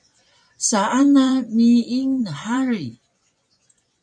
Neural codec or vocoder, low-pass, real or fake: vocoder, 24 kHz, 100 mel bands, Vocos; 9.9 kHz; fake